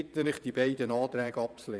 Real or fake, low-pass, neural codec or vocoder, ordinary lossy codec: fake; none; vocoder, 22.05 kHz, 80 mel bands, Vocos; none